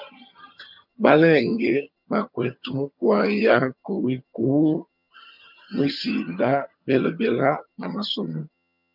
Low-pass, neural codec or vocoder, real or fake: 5.4 kHz; vocoder, 22.05 kHz, 80 mel bands, HiFi-GAN; fake